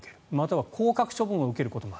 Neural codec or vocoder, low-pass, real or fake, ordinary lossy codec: none; none; real; none